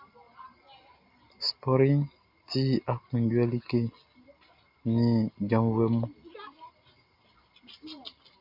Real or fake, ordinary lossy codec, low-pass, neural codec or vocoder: real; MP3, 48 kbps; 5.4 kHz; none